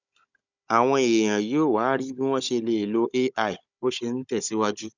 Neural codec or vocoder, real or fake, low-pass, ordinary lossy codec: codec, 16 kHz, 16 kbps, FunCodec, trained on Chinese and English, 50 frames a second; fake; 7.2 kHz; none